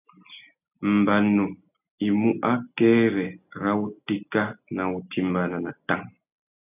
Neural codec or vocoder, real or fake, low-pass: none; real; 3.6 kHz